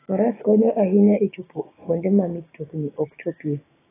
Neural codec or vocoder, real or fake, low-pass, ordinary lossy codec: codec, 16 kHz, 6 kbps, DAC; fake; 3.6 kHz; AAC, 16 kbps